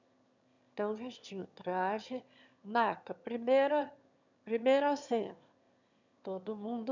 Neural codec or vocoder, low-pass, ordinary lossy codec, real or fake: autoencoder, 22.05 kHz, a latent of 192 numbers a frame, VITS, trained on one speaker; 7.2 kHz; none; fake